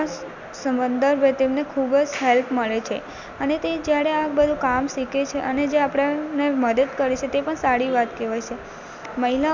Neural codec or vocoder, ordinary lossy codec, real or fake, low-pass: none; none; real; 7.2 kHz